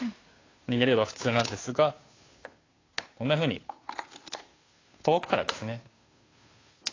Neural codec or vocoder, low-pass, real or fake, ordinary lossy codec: codec, 16 kHz, 2 kbps, FunCodec, trained on Chinese and English, 25 frames a second; 7.2 kHz; fake; AAC, 32 kbps